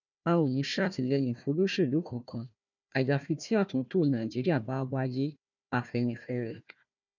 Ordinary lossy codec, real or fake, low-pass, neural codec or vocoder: none; fake; 7.2 kHz; codec, 16 kHz, 1 kbps, FunCodec, trained on Chinese and English, 50 frames a second